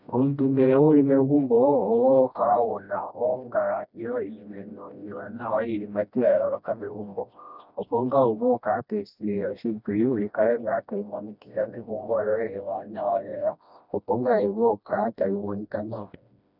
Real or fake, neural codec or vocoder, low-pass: fake; codec, 16 kHz, 1 kbps, FreqCodec, smaller model; 5.4 kHz